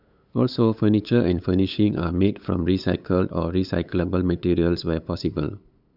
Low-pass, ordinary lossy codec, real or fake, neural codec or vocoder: 5.4 kHz; none; fake; codec, 16 kHz, 8 kbps, FunCodec, trained on LibriTTS, 25 frames a second